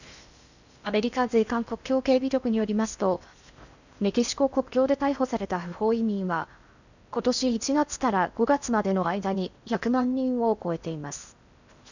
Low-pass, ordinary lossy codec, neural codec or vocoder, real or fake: 7.2 kHz; none; codec, 16 kHz in and 24 kHz out, 0.6 kbps, FocalCodec, streaming, 4096 codes; fake